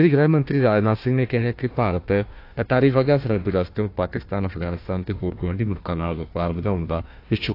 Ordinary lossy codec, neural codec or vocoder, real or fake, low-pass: AAC, 32 kbps; codec, 16 kHz, 1 kbps, FunCodec, trained on Chinese and English, 50 frames a second; fake; 5.4 kHz